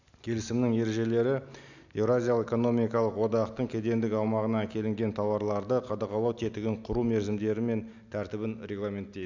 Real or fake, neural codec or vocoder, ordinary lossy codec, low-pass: real; none; none; 7.2 kHz